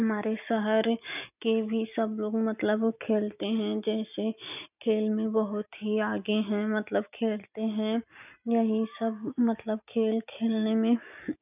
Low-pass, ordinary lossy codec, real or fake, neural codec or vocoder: 3.6 kHz; none; real; none